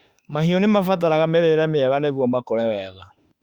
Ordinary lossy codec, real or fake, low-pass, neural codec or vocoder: Opus, 64 kbps; fake; 19.8 kHz; autoencoder, 48 kHz, 32 numbers a frame, DAC-VAE, trained on Japanese speech